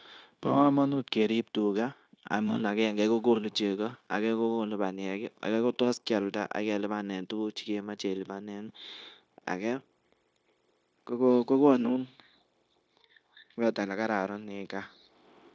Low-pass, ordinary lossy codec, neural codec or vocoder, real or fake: none; none; codec, 16 kHz, 0.9 kbps, LongCat-Audio-Codec; fake